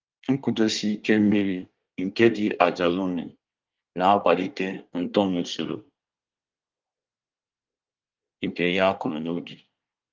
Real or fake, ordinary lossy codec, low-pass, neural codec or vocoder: fake; Opus, 32 kbps; 7.2 kHz; codec, 24 kHz, 1 kbps, SNAC